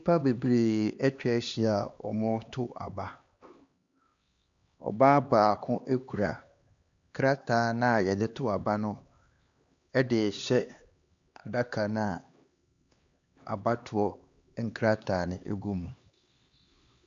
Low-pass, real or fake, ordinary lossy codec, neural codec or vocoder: 7.2 kHz; fake; Opus, 64 kbps; codec, 16 kHz, 2 kbps, X-Codec, HuBERT features, trained on LibriSpeech